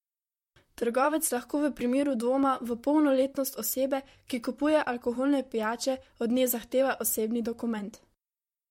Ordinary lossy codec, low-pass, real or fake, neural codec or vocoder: MP3, 64 kbps; 19.8 kHz; fake; vocoder, 44.1 kHz, 128 mel bands, Pupu-Vocoder